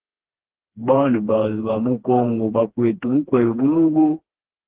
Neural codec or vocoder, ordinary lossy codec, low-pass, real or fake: codec, 16 kHz, 2 kbps, FreqCodec, smaller model; Opus, 16 kbps; 3.6 kHz; fake